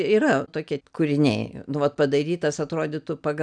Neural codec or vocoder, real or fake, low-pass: none; real; 9.9 kHz